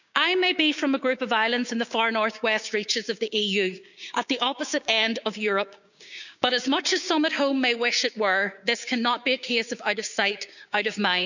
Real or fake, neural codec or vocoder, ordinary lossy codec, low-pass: fake; codec, 16 kHz, 6 kbps, DAC; none; 7.2 kHz